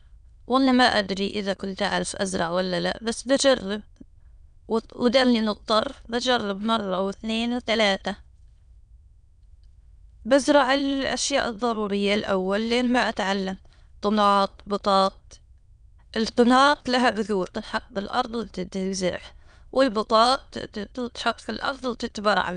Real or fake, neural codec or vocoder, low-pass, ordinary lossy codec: fake; autoencoder, 22.05 kHz, a latent of 192 numbers a frame, VITS, trained on many speakers; 9.9 kHz; none